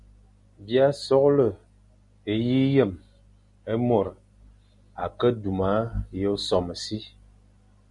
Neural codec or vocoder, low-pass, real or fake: none; 10.8 kHz; real